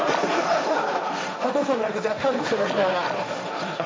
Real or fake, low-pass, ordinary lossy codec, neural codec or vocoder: fake; none; none; codec, 16 kHz, 1.1 kbps, Voila-Tokenizer